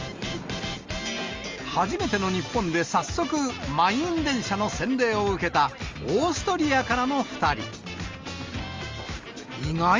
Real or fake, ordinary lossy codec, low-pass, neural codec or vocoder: real; Opus, 32 kbps; 7.2 kHz; none